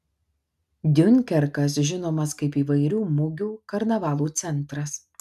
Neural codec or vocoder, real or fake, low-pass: none; real; 14.4 kHz